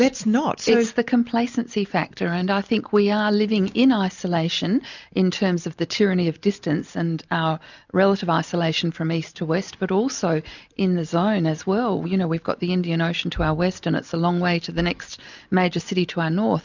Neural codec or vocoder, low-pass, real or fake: none; 7.2 kHz; real